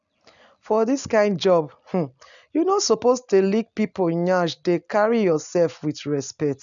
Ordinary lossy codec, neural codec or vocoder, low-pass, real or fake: none; none; 7.2 kHz; real